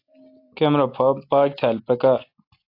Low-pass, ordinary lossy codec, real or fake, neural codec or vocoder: 5.4 kHz; AAC, 32 kbps; real; none